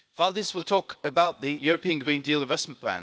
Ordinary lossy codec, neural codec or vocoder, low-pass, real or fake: none; codec, 16 kHz, 0.8 kbps, ZipCodec; none; fake